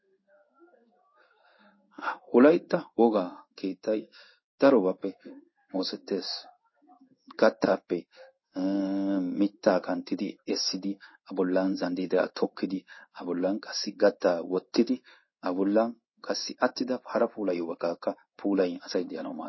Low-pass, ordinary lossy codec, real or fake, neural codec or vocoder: 7.2 kHz; MP3, 24 kbps; fake; codec, 16 kHz in and 24 kHz out, 1 kbps, XY-Tokenizer